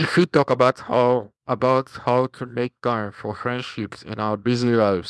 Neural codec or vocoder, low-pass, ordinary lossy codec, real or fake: codec, 24 kHz, 0.9 kbps, WavTokenizer, small release; none; none; fake